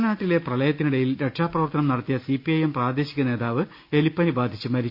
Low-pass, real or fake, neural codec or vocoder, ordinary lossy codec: 5.4 kHz; real; none; Opus, 64 kbps